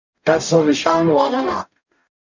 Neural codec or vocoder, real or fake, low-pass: codec, 44.1 kHz, 0.9 kbps, DAC; fake; 7.2 kHz